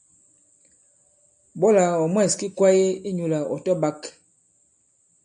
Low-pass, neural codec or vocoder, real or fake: 9.9 kHz; none; real